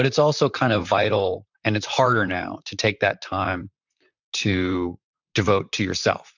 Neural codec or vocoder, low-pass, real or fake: vocoder, 44.1 kHz, 128 mel bands, Pupu-Vocoder; 7.2 kHz; fake